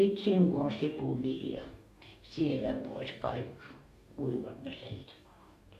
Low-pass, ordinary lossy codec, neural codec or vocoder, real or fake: 14.4 kHz; none; codec, 44.1 kHz, 2.6 kbps, DAC; fake